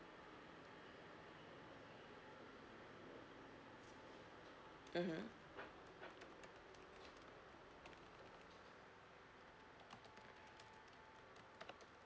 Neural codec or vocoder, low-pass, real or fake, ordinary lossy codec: none; none; real; none